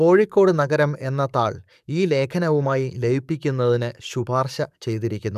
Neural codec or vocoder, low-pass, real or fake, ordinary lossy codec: codec, 44.1 kHz, 7.8 kbps, DAC; 14.4 kHz; fake; none